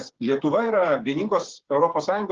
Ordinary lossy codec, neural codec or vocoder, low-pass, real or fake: Opus, 16 kbps; codec, 16 kHz, 8 kbps, FreqCodec, smaller model; 7.2 kHz; fake